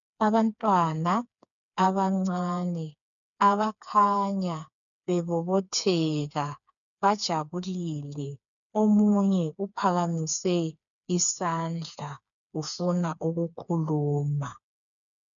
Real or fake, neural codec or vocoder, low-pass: fake; codec, 16 kHz, 4 kbps, FreqCodec, smaller model; 7.2 kHz